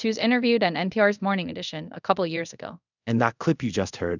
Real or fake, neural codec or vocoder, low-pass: fake; codec, 24 kHz, 0.5 kbps, DualCodec; 7.2 kHz